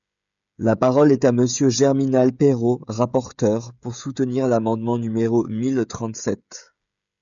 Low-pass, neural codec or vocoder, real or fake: 7.2 kHz; codec, 16 kHz, 16 kbps, FreqCodec, smaller model; fake